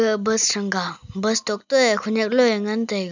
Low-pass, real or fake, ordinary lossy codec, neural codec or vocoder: 7.2 kHz; real; none; none